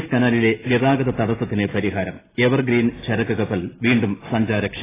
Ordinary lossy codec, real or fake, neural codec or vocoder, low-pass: AAC, 16 kbps; real; none; 3.6 kHz